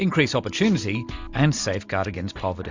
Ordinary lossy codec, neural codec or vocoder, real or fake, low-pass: MP3, 64 kbps; none; real; 7.2 kHz